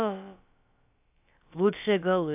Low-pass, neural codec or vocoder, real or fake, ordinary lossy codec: 3.6 kHz; codec, 16 kHz, about 1 kbps, DyCAST, with the encoder's durations; fake; AAC, 32 kbps